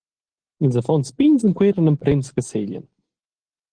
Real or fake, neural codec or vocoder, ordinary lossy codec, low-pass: real; none; Opus, 16 kbps; 9.9 kHz